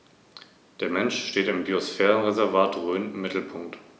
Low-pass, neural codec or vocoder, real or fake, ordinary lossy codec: none; none; real; none